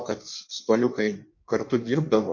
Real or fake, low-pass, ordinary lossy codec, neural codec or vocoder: fake; 7.2 kHz; MP3, 48 kbps; codec, 16 kHz in and 24 kHz out, 1.1 kbps, FireRedTTS-2 codec